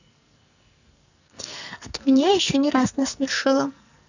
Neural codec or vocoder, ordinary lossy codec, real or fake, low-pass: codec, 44.1 kHz, 2.6 kbps, SNAC; none; fake; 7.2 kHz